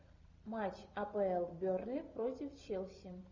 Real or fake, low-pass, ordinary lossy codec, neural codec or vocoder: real; 7.2 kHz; MP3, 64 kbps; none